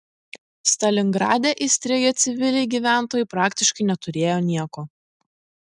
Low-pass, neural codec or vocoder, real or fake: 10.8 kHz; none; real